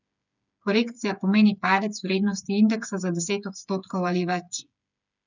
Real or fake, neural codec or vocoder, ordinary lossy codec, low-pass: fake; codec, 16 kHz, 8 kbps, FreqCodec, smaller model; none; 7.2 kHz